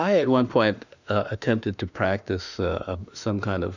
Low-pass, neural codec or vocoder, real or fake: 7.2 kHz; autoencoder, 48 kHz, 32 numbers a frame, DAC-VAE, trained on Japanese speech; fake